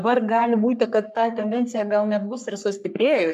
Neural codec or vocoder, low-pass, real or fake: codec, 44.1 kHz, 3.4 kbps, Pupu-Codec; 14.4 kHz; fake